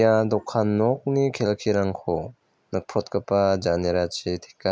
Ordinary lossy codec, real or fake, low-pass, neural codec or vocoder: none; real; none; none